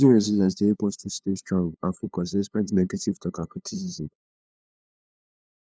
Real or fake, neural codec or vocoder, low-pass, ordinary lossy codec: fake; codec, 16 kHz, 2 kbps, FunCodec, trained on LibriTTS, 25 frames a second; none; none